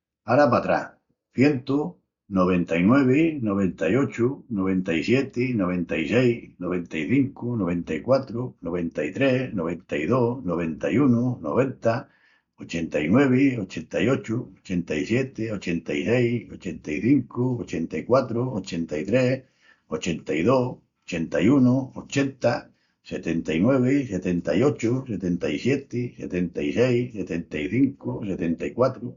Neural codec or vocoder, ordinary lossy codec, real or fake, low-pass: none; Opus, 64 kbps; real; 7.2 kHz